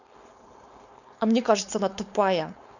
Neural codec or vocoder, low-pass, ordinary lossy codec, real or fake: codec, 16 kHz, 4.8 kbps, FACodec; 7.2 kHz; none; fake